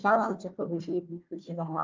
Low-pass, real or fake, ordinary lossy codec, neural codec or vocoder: 7.2 kHz; fake; Opus, 24 kbps; codec, 16 kHz, 1 kbps, FunCodec, trained on Chinese and English, 50 frames a second